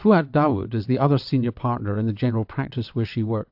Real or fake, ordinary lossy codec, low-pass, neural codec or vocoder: fake; AAC, 48 kbps; 5.4 kHz; vocoder, 22.05 kHz, 80 mel bands, WaveNeXt